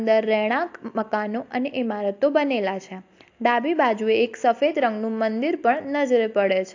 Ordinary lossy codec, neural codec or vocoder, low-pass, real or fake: AAC, 48 kbps; none; 7.2 kHz; real